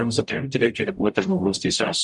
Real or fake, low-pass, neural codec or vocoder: fake; 10.8 kHz; codec, 44.1 kHz, 0.9 kbps, DAC